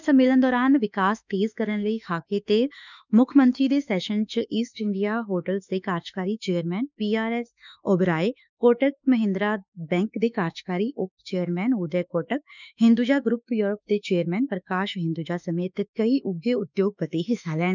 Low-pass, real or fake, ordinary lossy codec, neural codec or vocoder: 7.2 kHz; fake; none; autoencoder, 48 kHz, 32 numbers a frame, DAC-VAE, trained on Japanese speech